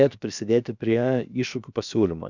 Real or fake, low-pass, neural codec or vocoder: fake; 7.2 kHz; codec, 16 kHz, about 1 kbps, DyCAST, with the encoder's durations